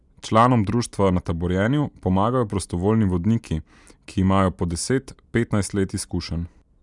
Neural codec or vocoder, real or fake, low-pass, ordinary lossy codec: none; real; 10.8 kHz; none